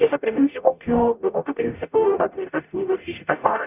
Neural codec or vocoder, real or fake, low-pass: codec, 44.1 kHz, 0.9 kbps, DAC; fake; 3.6 kHz